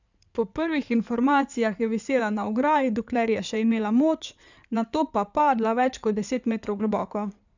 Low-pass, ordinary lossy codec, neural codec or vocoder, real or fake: 7.2 kHz; none; codec, 16 kHz in and 24 kHz out, 2.2 kbps, FireRedTTS-2 codec; fake